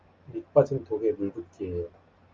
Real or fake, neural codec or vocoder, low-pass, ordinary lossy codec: real; none; 7.2 kHz; Opus, 24 kbps